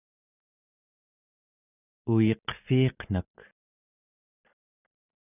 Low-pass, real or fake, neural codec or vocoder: 3.6 kHz; real; none